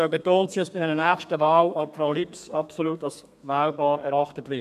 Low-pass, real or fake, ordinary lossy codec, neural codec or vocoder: 14.4 kHz; fake; none; codec, 32 kHz, 1.9 kbps, SNAC